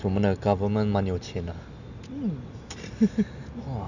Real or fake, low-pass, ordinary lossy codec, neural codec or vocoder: real; 7.2 kHz; none; none